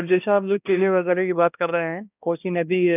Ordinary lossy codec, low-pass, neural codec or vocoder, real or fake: none; 3.6 kHz; codec, 16 kHz, 1 kbps, X-Codec, HuBERT features, trained on balanced general audio; fake